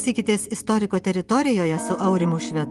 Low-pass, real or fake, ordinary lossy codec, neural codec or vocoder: 10.8 kHz; fake; Opus, 24 kbps; vocoder, 24 kHz, 100 mel bands, Vocos